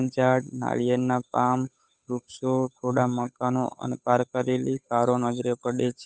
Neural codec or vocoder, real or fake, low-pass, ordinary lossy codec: codec, 16 kHz, 8 kbps, FunCodec, trained on Chinese and English, 25 frames a second; fake; none; none